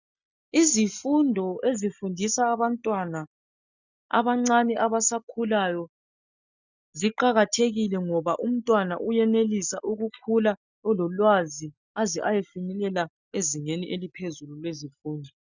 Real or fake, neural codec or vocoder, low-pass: real; none; 7.2 kHz